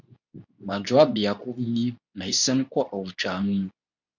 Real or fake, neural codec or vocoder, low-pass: fake; codec, 24 kHz, 0.9 kbps, WavTokenizer, medium speech release version 2; 7.2 kHz